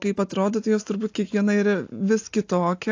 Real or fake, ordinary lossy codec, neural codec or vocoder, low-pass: real; AAC, 48 kbps; none; 7.2 kHz